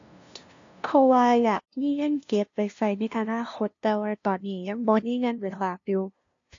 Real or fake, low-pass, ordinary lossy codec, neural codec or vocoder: fake; 7.2 kHz; AAC, 48 kbps; codec, 16 kHz, 0.5 kbps, FunCodec, trained on LibriTTS, 25 frames a second